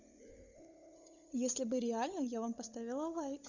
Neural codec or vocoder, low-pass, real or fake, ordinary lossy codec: codec, 16 kHz, 16 kbps, FunCodec, trained on LibriTTS, 50 frames a second; 7.2 kHz; fake; none